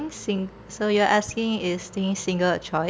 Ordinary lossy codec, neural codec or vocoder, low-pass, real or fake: none; none; none; real